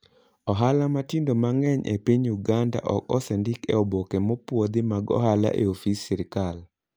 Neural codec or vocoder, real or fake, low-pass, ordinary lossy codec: none; real; none; none